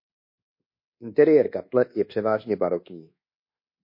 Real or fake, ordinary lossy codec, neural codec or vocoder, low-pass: fake; MP3, 32 kbps; codec, 24 kHz, 1.2 kbps, DualCodec; 5.4 kHz